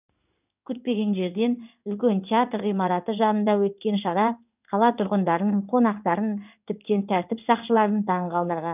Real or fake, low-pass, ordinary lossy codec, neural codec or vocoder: fake; 3.6 kHz; none; codec, 16 kHz in and 24 kHz out, 1 kbps, XY-Tokenizer